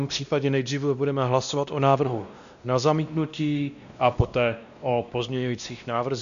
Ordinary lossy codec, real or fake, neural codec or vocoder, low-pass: MP3, 96 kbps; fake; codec, 16 kHz, 1 kbps, X-Codec, WavLM features, trained on Multilingual LibriSpeech; 7.2 kHz